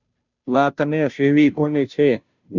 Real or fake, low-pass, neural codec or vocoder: fake; 7.2 kHz; codec, 16 kHz, 0.5 kbps, FunCodec, trained on Chinese and English, 25 frames a second